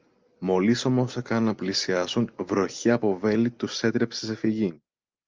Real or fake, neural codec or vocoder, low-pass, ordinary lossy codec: real; none; 7.2 kHz; Opus, 24 kbps